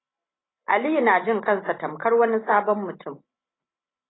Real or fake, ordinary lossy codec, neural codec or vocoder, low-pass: real; AAC, 16 kbps; none; 7.2 kHz